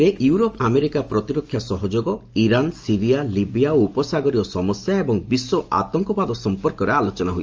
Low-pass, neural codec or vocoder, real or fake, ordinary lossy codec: 7.2 kHz; none; real; Opus, 24 kbps